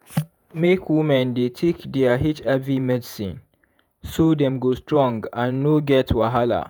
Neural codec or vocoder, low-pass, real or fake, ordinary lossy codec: vocoder, 48 kHz, 128 mel bands, Vocos; none; fake; none